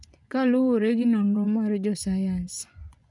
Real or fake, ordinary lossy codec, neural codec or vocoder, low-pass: fake; none; vocoder, 24 kHz, 100 mel bands, Vocos; 10.8 kHz